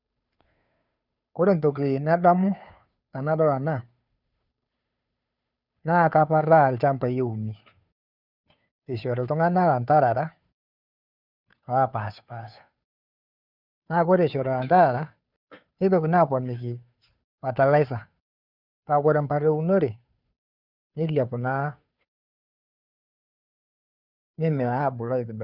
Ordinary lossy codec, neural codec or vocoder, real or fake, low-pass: none; codec, 16 kHz, 2 kbps, FunCodec, trained on Chinese and English, 25 frames a second; fake; 5.4 kHz